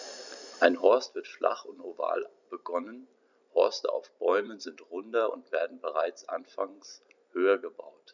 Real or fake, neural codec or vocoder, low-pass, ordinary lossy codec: fake; vocoder, 44.1 kHz, 128 mel bands every 256 samples, BigVGAN v2; 7.2 kHz; none